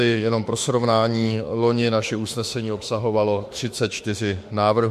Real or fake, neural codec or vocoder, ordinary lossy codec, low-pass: fake; autoencoder, 48 kHz, 32 numbers a frame, DAC-VAE, trained on Japanese speech; MP3, 64 kbps; 14.4 kHz